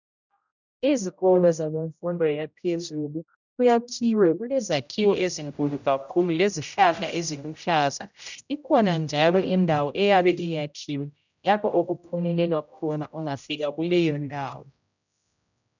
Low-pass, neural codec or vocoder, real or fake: 7.2 kHz; codec, 16 kHz, 0.5 kbps, X-Codec, HuBERT features, trained on general audio; fake